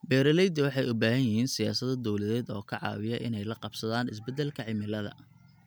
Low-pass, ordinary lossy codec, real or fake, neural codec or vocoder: none; none; real; none